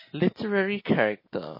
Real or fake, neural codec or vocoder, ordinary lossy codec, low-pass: real; none; MP3, 24 kbps; 5.4 kHz